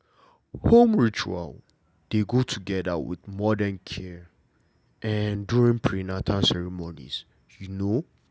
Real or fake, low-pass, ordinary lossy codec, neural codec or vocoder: real; none; none; none